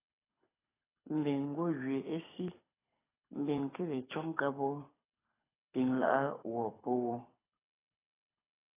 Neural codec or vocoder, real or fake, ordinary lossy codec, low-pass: codec, 24 kHz, 6 kbps, HILCodec; fake; AAC, 16 kbps; 3.6 kHz